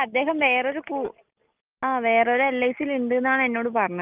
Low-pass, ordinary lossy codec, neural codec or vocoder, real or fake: 3.6 kHz; Opus, 24 kbps; none; real